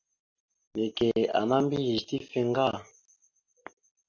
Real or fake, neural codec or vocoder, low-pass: real; none; 7.2 kHz